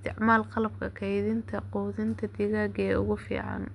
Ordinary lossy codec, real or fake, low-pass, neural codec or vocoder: none; real; 10.8 kHz; none